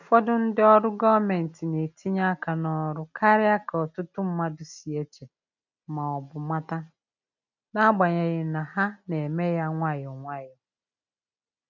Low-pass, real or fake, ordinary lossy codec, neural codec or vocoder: 7.2 kHz; real; none; none